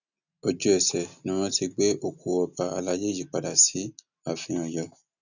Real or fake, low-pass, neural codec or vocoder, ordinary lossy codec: real; 7.2 kHz; none; none